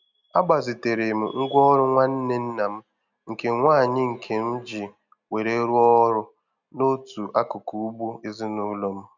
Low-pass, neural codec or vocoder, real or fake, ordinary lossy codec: 7.2 kHz; none; real; none